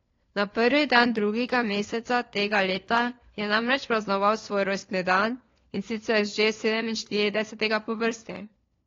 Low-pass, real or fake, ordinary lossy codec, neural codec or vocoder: 7.2 kHz; fake; AAC, 32 kbps; codec, 16 kHz, 4 kbps, FunCodec, trained on LibriTTS, 50 frames a second